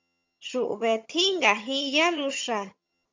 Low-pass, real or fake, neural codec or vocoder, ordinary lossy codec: 7.2 kHz; fake; vocoder, 22.05 kHz, 80 mel bands, HiFi-GAN; MP3, 64 kbps